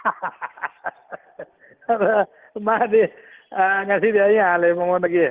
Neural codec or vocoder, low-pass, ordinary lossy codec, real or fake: none; 3.6 kHz; Opus, 16 kbps; real